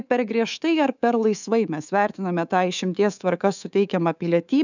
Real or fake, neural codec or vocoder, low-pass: fake; codec, 24 kHz, 3.1 kbps, DualCodec; 7.2 kHz